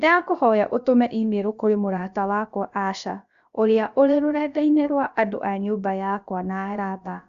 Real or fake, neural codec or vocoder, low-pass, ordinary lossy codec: fake; codec, 16 kHz, about 1 kbps, DyCAST, with the encoder's durations; 7.2 kHz; Opus, 64 kbps